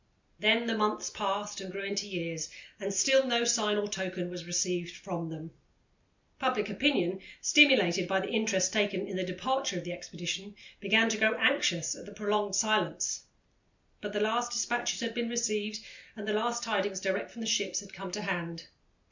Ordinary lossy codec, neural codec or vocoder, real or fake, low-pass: MP3, 64 kbps; none; real; 7.2 kHz